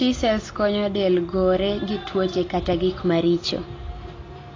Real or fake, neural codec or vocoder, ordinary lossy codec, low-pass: real; none; AAC, 32 kbps; 7.2 kHz